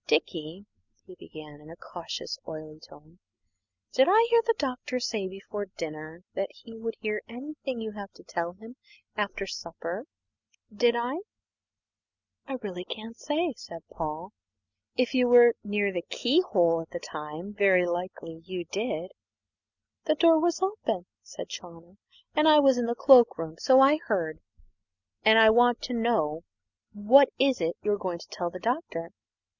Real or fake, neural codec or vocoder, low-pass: real; none; 7.2 kHz